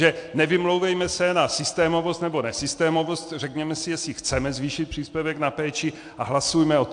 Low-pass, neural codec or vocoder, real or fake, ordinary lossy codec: 9.9 kHz; none; real; AAC, 64 kbps